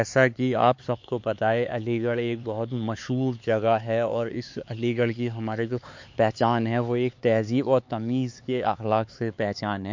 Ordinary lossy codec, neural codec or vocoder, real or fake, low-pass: MP3, 48 kbps; codec, 16 kHz, 4 kbps, X-Codec, HuBERT features, trained on LibriSpeech; fake; 7.2 kHz